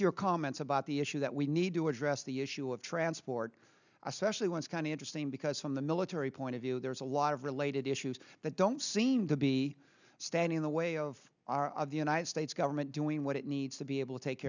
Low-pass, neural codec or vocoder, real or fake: 7.2 kHz; none; real